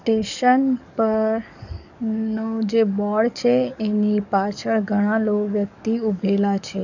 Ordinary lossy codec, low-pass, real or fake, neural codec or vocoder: none; 7.2 kHz; fake; codec, 44.1 kHz, 7.8 kbps, DAC